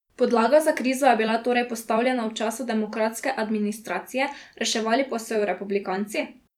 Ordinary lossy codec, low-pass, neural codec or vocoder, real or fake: none; 19.8 kHz; vocoder, 44.1 kHz, 128 mel bands every 256 samples, BigVGAN v2; fake